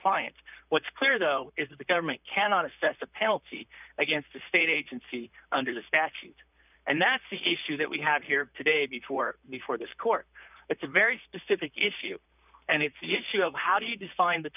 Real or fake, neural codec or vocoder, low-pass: fake; vocoder, 44.1 kHz, 128 mel bands, Pupu-Vocoder; 3.6 kHz